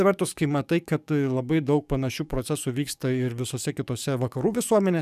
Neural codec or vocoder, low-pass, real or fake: codec, 44.1 kHz, 7.8 kbps, DAC; 14.4 kHz; fake